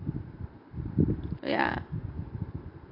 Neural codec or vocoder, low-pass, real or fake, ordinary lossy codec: vocoder, 44.1 kHz, 128 mel bands every 512 samples, BigVGAN v2; 5.4 kHz; fake; none